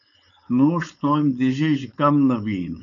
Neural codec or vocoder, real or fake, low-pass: codec, 16 kHz, 4.8 kbps, FACodec; fake; 7.2 kHz